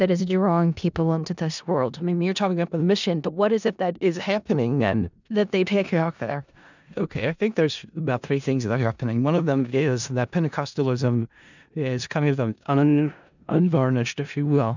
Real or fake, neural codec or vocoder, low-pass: fake; codec, 16 kHz in and 24 kHz out, 0.4 kbps, LongCat-Audio-Codec, four codebook decoder; 7.2 kHz